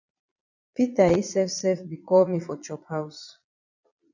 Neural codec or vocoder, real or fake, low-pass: vocoder, 22.05 kHz, 80 mel bands, Vocos; fake; 7.2 kHz